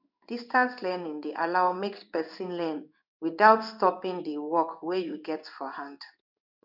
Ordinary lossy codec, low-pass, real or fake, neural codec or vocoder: none; 5.4 kHz; fake; codec, 16 kHz in and 24 kHz out, 1 kbps, XY-Tokenizer